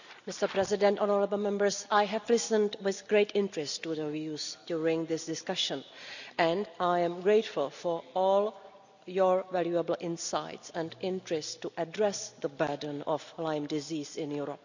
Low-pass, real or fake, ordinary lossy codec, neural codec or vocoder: 7.2 kHz; real; none; none